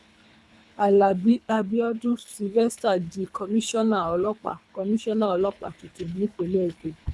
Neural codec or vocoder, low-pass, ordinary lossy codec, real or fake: codec, 24 kHz, 3 kbps, HILCodec; none; none; fake